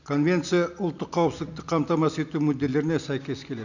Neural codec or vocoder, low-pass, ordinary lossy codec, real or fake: none; 7.2 kHz; none; real